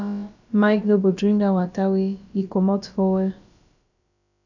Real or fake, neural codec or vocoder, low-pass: fake; codec, 16 kHz, about 1 kbps, DyCAST, with the encoder's durations; 7.2 kHz